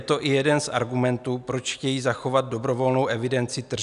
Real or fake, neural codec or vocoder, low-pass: real; none; 10.8 kHz